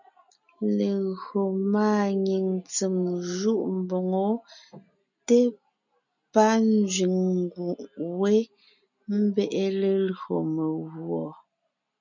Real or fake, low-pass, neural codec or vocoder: real; 7.2 kHz; none